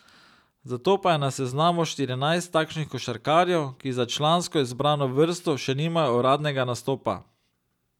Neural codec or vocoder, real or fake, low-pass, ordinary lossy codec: none; real; 19.8 kHz; none